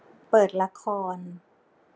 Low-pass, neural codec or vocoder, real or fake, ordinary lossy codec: none; none; real; none